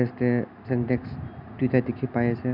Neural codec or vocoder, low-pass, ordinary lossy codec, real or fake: none; 5.4 kHz; none; real